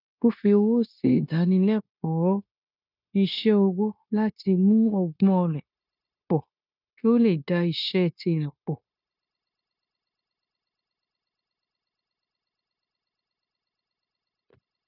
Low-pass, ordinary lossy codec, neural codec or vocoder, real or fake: 5.4 kHz; none; codec, 16 kHz, 0.9 kbps, LongCat-Audio-Codec; fake